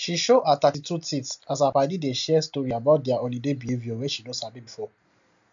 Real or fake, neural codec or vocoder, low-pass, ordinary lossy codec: real; none; 7.2 kHz; MP3, 64 kbps